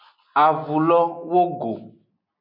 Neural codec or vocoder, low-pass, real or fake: none; 5.4 kHz; real